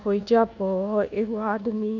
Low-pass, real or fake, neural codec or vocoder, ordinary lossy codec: 7.2 kHz; fake; codec, 24 kHz, 1.2 kbps, DualCodec; none